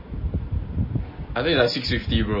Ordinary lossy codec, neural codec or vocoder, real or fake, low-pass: MP3, 24 kbps; none; real; 5.4 kHz